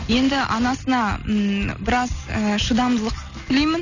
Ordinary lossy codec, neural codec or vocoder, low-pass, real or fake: none; none; 7.2 kHz; real